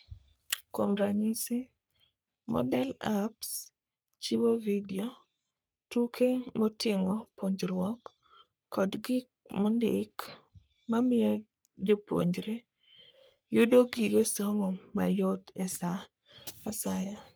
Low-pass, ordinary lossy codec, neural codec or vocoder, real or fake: none; none; codec, 44.1 kHz, 3.4 kbps, Pupu-Codec; fake